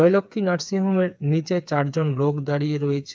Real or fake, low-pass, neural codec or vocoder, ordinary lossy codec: fake; none; codec, 16 kHz, 4 kbps, FreqCodec, smaller model; none